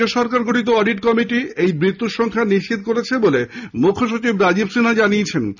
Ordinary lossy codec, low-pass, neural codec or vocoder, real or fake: none; 7.2 kHz; none; real